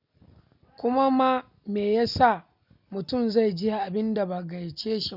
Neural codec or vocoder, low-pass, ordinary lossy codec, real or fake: none; 5.4 kHz; none; real